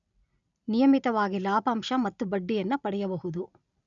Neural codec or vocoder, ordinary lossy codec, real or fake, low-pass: none; none; real; 7.2 kHz